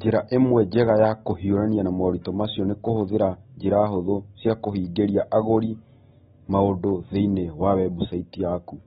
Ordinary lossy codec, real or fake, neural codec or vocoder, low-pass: AAC, 16 kbps; real; none; 19.8 kHz